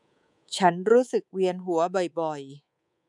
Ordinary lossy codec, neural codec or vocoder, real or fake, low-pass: none; codec, 24 kHz, 3.1 kbps, DualCodec; fake; 10.8 kHz